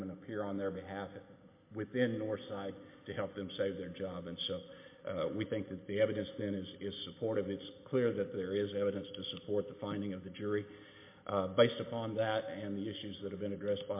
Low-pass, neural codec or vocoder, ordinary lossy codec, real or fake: 3.6 kHz; none; MP3, 24 kbps; real